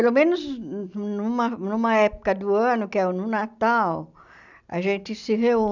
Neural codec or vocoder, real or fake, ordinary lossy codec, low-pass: none; real; none; 7.2 kHz